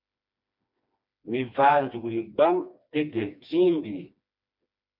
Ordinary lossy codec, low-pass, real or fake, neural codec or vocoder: MP3, 48 kbps; 5.4 kHz; fake; codec, 16 kHz, 2 kbps, FreqCodec, smaller model